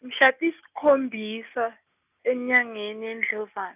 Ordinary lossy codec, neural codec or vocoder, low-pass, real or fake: none; none; 3.6 kHz; real